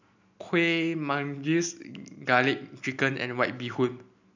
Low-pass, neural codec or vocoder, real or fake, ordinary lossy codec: 7.2 kHz; none; real; none